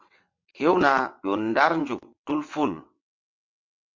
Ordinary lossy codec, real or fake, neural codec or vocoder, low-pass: MP3, 48 kbps; fake; vocoder, 22.05 kHz, 80 mel bands, WaveNeXt; 7.2 kHz